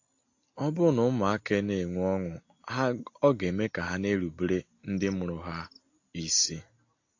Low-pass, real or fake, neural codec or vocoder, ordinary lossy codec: 7.2 kHz; real; none; AAC, 48 kbps